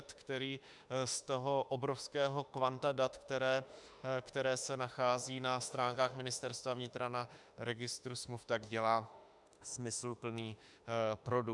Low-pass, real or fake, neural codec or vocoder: 10.8 kHz; fake; autoencoder, 48 kHz, 32 numbers a frame, DAC-VAE, trained on Japanese speech